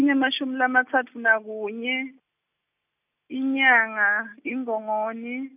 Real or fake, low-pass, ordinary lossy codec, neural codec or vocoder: real; 3.6 kHz; none; none